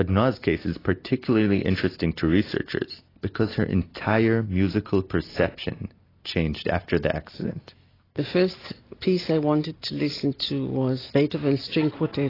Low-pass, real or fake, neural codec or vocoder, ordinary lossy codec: 5.4 kHz; real; none; AAC, 24 kbps